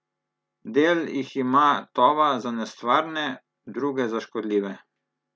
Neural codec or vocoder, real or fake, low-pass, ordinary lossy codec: none; real; none; none